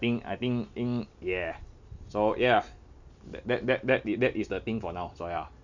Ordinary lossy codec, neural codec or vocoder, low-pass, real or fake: none; none; 7.2 kHz; real